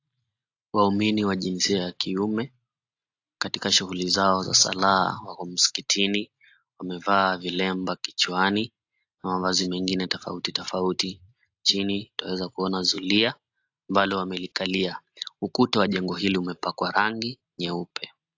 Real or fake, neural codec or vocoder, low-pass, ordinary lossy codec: real; none; 7.2 kHz; AAC, 48 kbps